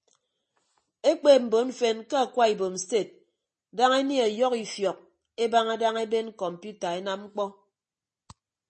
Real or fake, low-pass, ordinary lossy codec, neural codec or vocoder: real; 10.8 kHz; MP3, 32 kbps; none